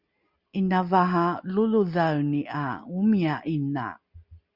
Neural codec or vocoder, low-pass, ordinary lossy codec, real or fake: none; 5.4 kHz; Opus, 64 kbps; real